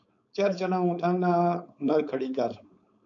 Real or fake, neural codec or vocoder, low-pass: fake; codec, 16 kHz, 4.8 kbps, FACodec; 7.2 kHz